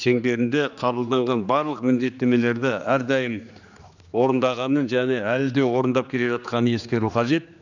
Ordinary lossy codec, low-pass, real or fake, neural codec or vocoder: none; 7.2 kHz; fake; codec, 16 kHz, 2 kbps, X-Codec, HuBERT features, trained on general audio